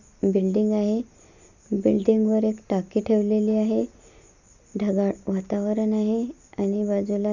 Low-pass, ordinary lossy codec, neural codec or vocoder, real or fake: 7.2 kHz; none; none; real